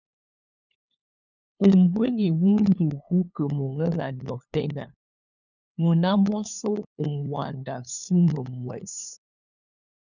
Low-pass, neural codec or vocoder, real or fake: 7.2 kHz; codec, 16 kHz, 2 kbps, FunCodec, trained on LibriTTS, 25 frames a second; fake